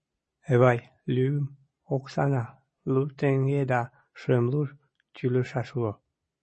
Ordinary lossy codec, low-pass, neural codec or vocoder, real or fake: MP3, 32 kbps; 9.9 kHz; none; real